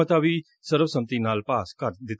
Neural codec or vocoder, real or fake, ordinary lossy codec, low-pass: none; real; none; none